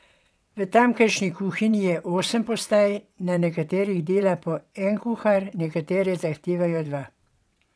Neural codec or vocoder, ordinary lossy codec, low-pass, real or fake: vocoder, 22.05 kHz, 80 mel bands, WaveNeXt; none; none; fake